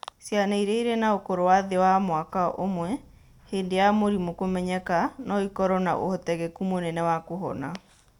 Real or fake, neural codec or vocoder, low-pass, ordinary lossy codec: real; none; 19.8 kHz; none